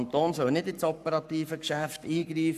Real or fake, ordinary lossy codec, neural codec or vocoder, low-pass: fake; none; codec, 44.1 kHz, 7.8 kbps, Pupu-Codec; 14.4 kHz